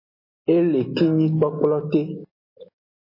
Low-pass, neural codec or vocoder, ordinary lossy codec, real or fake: 5.4 kHz; none; MP3, 24 kbps; real